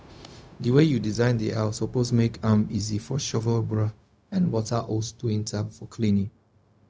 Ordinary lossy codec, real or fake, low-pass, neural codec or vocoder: none; fake; none; codec, 16 kHz, 0.4 kbps, LongCat-Audio-Codec